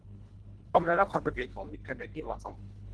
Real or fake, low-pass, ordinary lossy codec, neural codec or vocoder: fake; 10.8 kHz; Opus, 16 kbps; codec, 24 kHz, 1.5 kbps, HILCodec